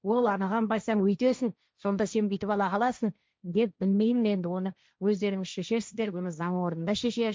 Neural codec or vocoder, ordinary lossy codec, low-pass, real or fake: codec, 16 kHz, 1.1 kbps, Voila-Tokenizer; none; none; fake